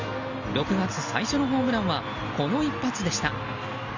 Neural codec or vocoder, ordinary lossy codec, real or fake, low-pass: none; Opus, 64 kbps; real; 7.2 kHz